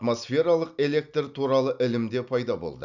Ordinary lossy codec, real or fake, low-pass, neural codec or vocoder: none; real; 7.2 kHz; none